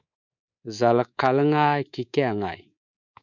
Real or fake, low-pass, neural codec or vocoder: fake; 7.2 kHz; codec, 24 kHz, 3.1 kbps, DualCodec